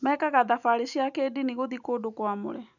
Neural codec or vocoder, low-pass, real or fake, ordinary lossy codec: none; 7.2 kHz; real; none